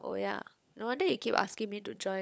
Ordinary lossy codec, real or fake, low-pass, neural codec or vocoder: none; fake; none; codec, 16 kHz, 16 kbps, FunCodec, trained on LibriTTS, 50 frames a second